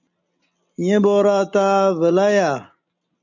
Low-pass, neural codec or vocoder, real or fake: 7.2 kHz; none; real